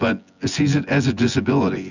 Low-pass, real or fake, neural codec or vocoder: 7.2 kHz; fake; vocoder, 24 kHz, 100 mel bands, Vocos